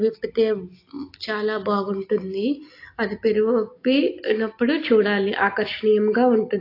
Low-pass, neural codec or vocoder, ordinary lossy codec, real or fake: 5.4 kHz; none; AAC, 32 kbps; real